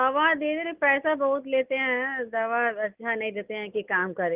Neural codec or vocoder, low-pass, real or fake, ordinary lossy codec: none; 3.6 kHz; real; Opus, 24 kbps